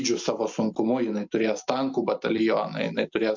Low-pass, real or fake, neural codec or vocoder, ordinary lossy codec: 7.2 kHz; real; none; MP3, 64 kbps